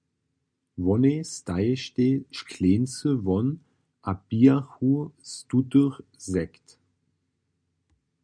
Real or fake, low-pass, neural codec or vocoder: real; 9.9 kHz; none